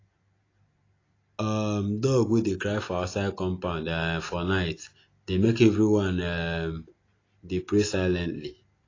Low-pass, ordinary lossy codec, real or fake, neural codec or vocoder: 7.2 kHz; AAC, 32 kbps; real; none